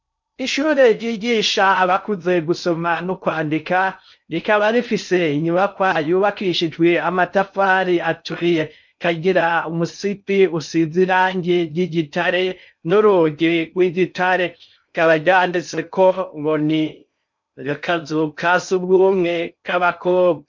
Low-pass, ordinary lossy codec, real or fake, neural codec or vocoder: 7.2 kHz; MP3, 64 kbps; fake; codec, 16 kHz in and 24 kHz out, 0.6 kbps, FocalCodec, streaming, 2048 codes